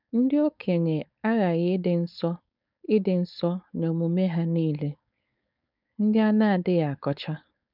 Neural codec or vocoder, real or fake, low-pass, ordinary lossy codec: codec, 16 kHz, 4.8 kbps, FACodec; fake; 5.4 kHz; none